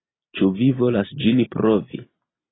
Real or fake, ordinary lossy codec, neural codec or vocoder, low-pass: real; AAC, 16 kbps; none; 7.2 kHz